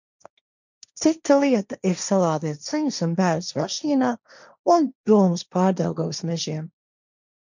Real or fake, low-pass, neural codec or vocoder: fake; 7.2 kHz; codec, 16 kHz, 1.1 kbps, Voila-Tokenizer